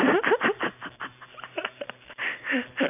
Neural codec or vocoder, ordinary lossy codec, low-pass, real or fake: none; none; 3.6 kHz; real